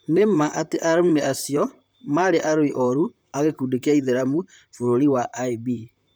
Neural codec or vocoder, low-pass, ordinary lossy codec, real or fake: vocoder, 44.1 kHz, 128 mel bands, Pupu-Vocoder; none; none; fake